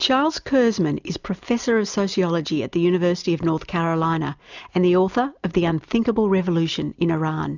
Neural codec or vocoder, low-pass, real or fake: none; 7.2 kHz; real